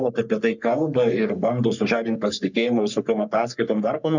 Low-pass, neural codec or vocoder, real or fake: 7.2 kHz; codec, 44.1 kHz, 3.4 kbps, Pupu-Codec; fake